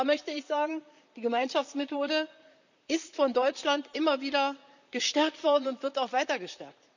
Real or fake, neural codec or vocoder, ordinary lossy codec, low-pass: fake; vocoder, 44.1 kHz, 128 mel bands, Pupu-Vocoder; none; 7.2 kHz